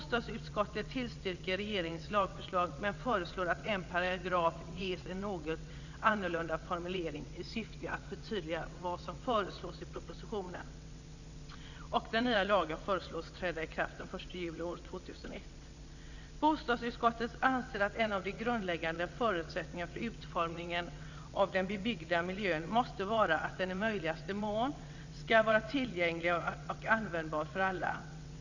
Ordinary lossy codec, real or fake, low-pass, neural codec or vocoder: none; fake; 7.2 kHz; vocoder, 22.05 kHz, 80 mel bands, WaveNeXt